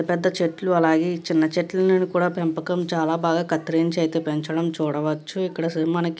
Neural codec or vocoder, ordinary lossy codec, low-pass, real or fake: none; none; none; real